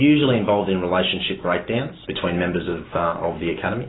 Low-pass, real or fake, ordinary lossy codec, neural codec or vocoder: 7.2 kHz; real; AAC, 16 kbps; none